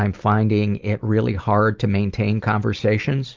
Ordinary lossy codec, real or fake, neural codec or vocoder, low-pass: Opus, 24 kbps; real; none; 7.2 kHz